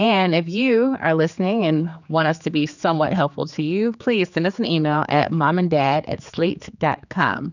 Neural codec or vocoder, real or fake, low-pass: codec, 16 kHz, 4 kbps, X-Codec, HuBERT features, trained on general audio; fake; 7.2 kHz